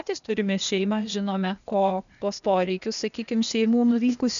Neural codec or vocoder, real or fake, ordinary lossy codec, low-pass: codec, 16 kHz, 0.8 kbps, ZipCodec; fake; MP3, 96 kbps; 7.2 kHz